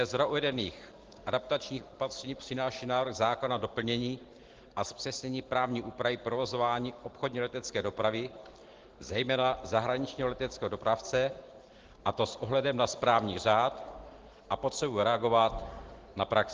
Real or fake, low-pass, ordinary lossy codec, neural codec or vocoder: real; 7.2 kHz; Opus, 16 kbps; none